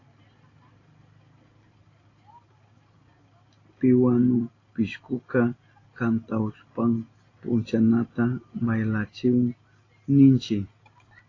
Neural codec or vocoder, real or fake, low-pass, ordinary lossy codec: none; real; 7.2 kHz; AAC, 32 kbps